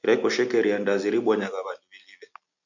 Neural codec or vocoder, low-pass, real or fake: none; 7.2 kHz; real